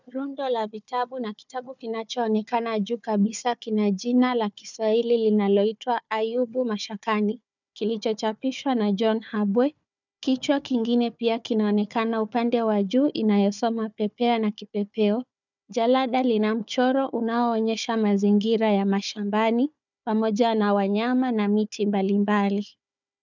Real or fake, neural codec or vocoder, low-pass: fake; codec, 16 kHz, 4 kbps, FunCodec, trained on Chinese and English, 50 frames a second; 7.2 kHz